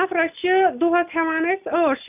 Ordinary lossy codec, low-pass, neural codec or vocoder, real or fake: none; 3.6 kHz; none; real